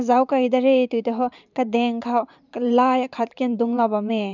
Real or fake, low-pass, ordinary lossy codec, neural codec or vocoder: fake; 7.2 kHz; none; vocoder, 44.1 kHz, 128 mel bands every 512 samples, BigVGAN v2